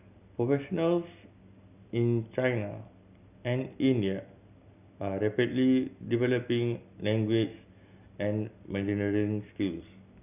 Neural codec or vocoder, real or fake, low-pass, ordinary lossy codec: none; real; 3.6 kHz; none